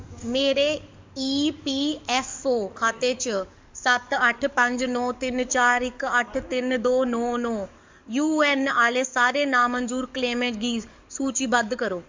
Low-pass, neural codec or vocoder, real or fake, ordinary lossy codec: 7.2 kHz; codec, 44.1 kHz, 7.8 kbps, DAC; fake; MP3, 64 kbps